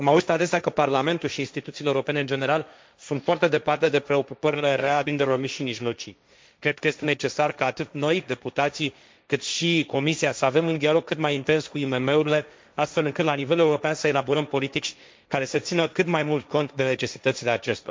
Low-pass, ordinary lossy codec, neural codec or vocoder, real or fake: none; none; codec, 16 kHz, 1.1 kbps, Voila-Tokenizer; fake